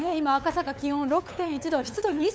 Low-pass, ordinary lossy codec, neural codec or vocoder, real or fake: none; none; codec, 16 kHz, 8 kbps, FunCodec, trained on LibriTTS, 25 frames a second; fake